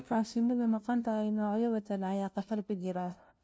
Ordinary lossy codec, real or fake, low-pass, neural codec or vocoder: none; fake; none; codec, 16 kHz, 0.5 kbps, FunCodec, trained on LibriTTS, 25 frames a second